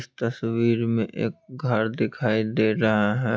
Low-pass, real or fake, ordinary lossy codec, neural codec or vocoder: none; real; none; none